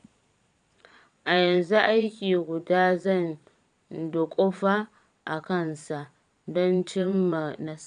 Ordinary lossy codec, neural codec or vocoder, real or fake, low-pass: none; vocoder, 22.05 kHz, 80 mel bands, Vocos; fake; 9.9 kHz